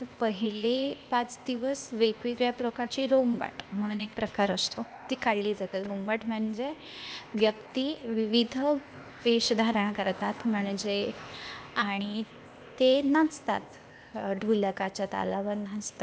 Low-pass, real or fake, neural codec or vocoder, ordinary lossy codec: none; fake; codec, 16 kHz, 0.8 kbps, ZipCodec; none